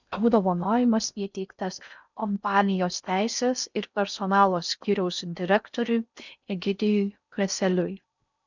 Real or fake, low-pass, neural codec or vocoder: fake; 7.2 kHz; codec, 16 kHz in and 24 kHz out, 0.6 kbps, FocalCodec, streaming, 4096 codes